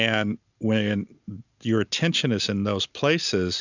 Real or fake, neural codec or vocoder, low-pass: real; none; 7.2 kHz